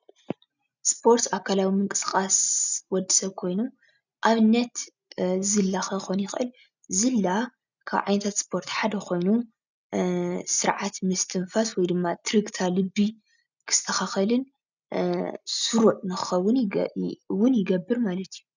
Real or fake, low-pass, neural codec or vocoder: real; 7.2 kHz; none